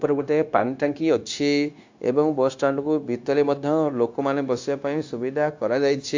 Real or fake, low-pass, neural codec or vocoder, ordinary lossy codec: fake; 7.2 kHz; codec, 16 kHz, 0.9 kbps, LongCat-Audio-Codec; AAC, 48 kbps